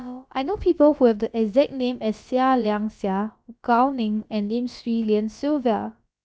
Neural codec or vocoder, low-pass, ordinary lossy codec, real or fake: codec, 16 kHz, about 1 kbps, DyCAST, with the encoder's durations; none; none; fake